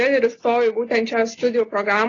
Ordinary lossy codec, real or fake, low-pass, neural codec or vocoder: AAC, 32 kbps; real; 7.2 kHz; none